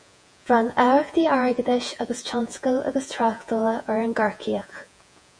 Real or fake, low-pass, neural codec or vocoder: fake; 9.9 kHz; vocoder, 48 kHz, 128 mel bands, Vocos